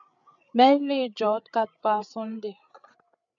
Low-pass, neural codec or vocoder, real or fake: 7.2 kHz; codec, 16 kHz, 8 kbps, FreqCodec, larger model; fake